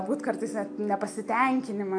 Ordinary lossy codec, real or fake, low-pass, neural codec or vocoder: AAC, 64 kbps; real; 9.9 kHz; none